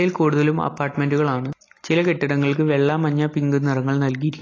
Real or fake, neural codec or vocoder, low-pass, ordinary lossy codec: real; none; 7.2 kHz; AAC, 32 kbps